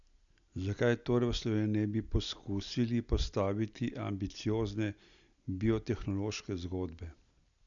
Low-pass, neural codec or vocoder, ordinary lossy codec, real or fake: 7.2 kHz; none; none; real